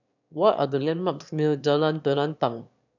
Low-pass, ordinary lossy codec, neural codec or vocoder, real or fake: 7.2 kHz; none; autoencoder, 22.05 kHz, a latent of 192 numbers a frame, VITS, trained on one speaker; fake